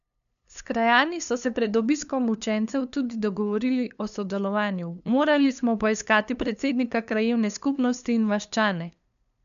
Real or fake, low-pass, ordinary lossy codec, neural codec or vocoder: fake; 7.2 kHz; none; codec, 16 kHz, 2 kbps, FunCodec, trained on LibriTTS, 25 frames a second